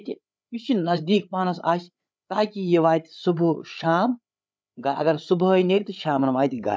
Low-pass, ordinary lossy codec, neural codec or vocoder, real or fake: none; none; codec, 16 kHz, 8 kbps, FreqCodec, larger model; fake